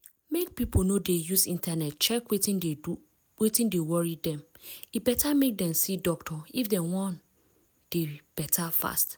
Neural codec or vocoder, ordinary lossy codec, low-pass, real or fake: none; none; none; real